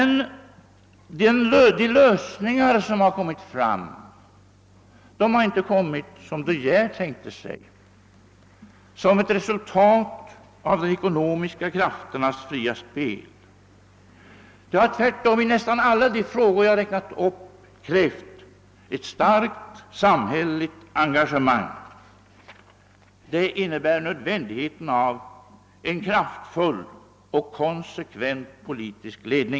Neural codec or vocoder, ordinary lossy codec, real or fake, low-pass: none; none; real; none